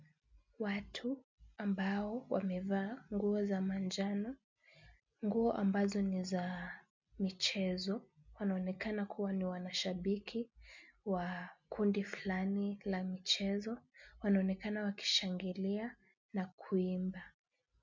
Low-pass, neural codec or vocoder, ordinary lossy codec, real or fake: 7.2 kHz; none; MP3, 64 kbps; real